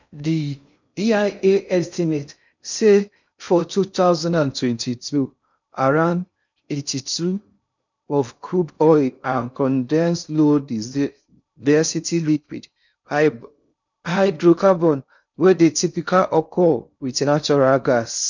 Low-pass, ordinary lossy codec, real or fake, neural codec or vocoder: 7.2 kHz; none; fake; codec, 16 kHz in and 24 kHz out, 0.6 kbps, FocalCodec, streaming, 2048 codes